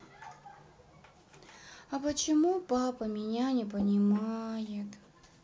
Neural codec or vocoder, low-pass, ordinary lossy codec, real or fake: none; none; none; real